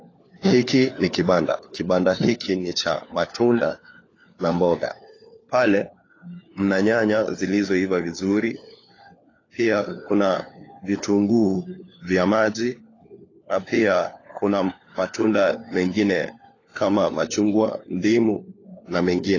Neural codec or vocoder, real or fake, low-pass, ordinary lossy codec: codec, 16 kHz, 4 kbps, FunCodec, trained on LibriTTS, 50 frames a second; fake; 7.2 kHz; AAC, 32 kbps